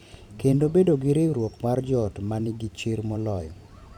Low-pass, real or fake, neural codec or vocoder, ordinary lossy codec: 19.8 kHz; fake; vocoder, 44.1 kHz, 128 mel bands every 512 samples, BigVGAN v2; none